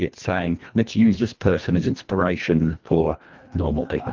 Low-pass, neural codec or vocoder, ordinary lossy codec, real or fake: 7.2 kHz; codec, 24 kHz, 1.5 kbps, HILCodec; Opus, 24 kbps; fake